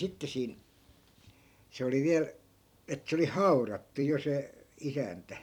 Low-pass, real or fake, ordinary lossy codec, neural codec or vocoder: 19.8 kHz; fake; none; vocoder, 44.1 kHz, 128 mel bands every 256 samples, BigVGAN v2